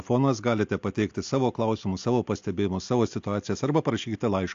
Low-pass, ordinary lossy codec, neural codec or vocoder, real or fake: 7.2 kHz; AAC, 64 kbps; none; real